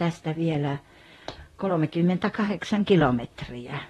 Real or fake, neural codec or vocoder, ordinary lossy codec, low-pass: fake; vocoder, 44.1 kHz, 128 mel bands, Pupu-Vocoder; AAC, 32 kbps; 19.8 kHz